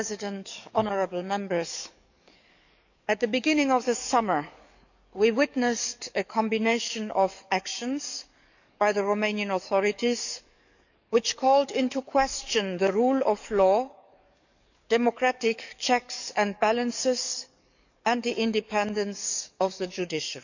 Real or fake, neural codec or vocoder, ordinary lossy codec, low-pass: fake; codec, 44.1 kHz, 7.8 kbps, DAC; none; 7.2 kHz